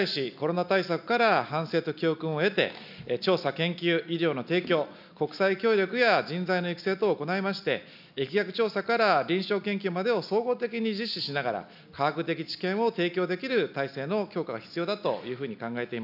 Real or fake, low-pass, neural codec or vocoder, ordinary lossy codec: real; 5.4 kHz; none; none